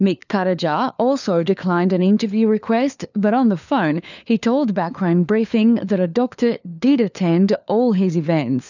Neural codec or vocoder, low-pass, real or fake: codec, 16 kHz, 4 kbps, FunCodec, trained on LibriTTS, 50 frames a second; 7.2 kHz; fake